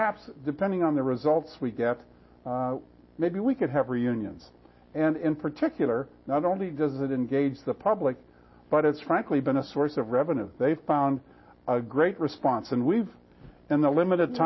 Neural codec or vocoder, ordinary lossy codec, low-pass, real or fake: none; MP3, 24 kbps; 7.2 kHz; real